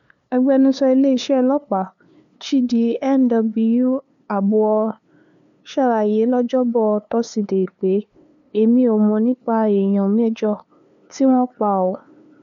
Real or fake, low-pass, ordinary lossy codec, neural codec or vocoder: fake; 7.2 kHz; none; codec, 16 kHz, 2 kbps, FunCodec, trained on LibriTTS, 25 frames a second